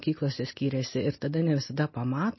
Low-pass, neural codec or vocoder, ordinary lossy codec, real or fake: 7.2 kHz; none; MP3, 24 kbps; real